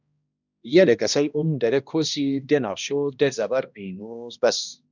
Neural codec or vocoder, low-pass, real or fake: codec, 16 kHz, 1 kbps, X-Codec, HuBERT features, trained on balanced general audio; 7.2 kHz; fake